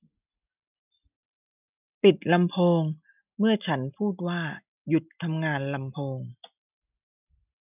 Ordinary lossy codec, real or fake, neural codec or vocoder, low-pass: none; real; none; 3.6 kHz